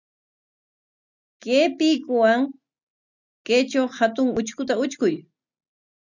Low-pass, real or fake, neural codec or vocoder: 7.2 kHz; real; none